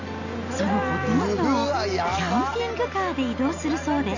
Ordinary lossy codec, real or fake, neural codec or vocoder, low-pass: none; real; none; 7.2 kHz